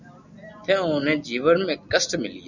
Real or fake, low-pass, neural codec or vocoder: real; 7.2 kHz; none